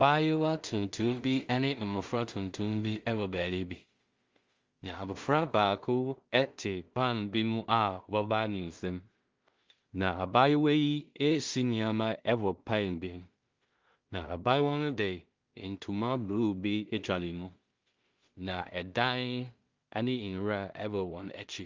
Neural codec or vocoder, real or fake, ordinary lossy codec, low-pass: codec, 16 kHz in and 24 kHz out, 0.4 kbps, LongCat-Audio-Codec, two codebook decoder; fake; Opus, 32 kbps; 7.2 kHz